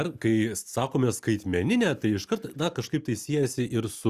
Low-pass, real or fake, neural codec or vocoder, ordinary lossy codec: 14.4 kHz; real; none; Opus, 64 kbps